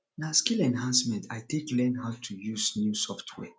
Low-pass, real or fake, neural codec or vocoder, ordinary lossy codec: none; real; none; none